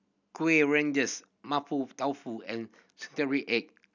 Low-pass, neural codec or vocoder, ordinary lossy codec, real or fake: 7.2 kHz; none; none; real